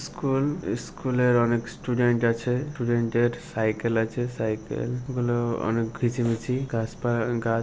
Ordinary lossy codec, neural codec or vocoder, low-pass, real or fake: none; none; none; real